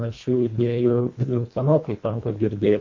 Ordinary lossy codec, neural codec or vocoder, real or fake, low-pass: MP3, 48 kbps; codec, 24 kHz, 1.5 kbps, HILCodec; fake; 7.2 kHz